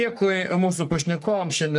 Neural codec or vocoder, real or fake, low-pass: codec, 44.1 kHz, 3.4 kbps, Pupu-Codec; fake; 10.8 kHz